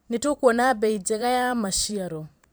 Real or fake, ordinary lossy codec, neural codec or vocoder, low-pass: fake; none; vocoder, 44.1 kHz, 128 mel bands every 512 samples, BigVGAN v2; none